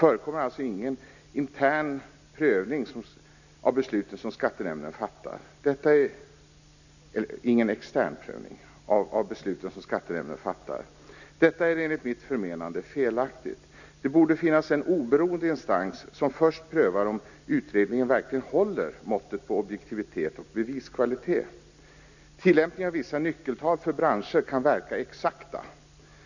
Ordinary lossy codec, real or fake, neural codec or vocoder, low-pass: none; real; none; 7.2 kHz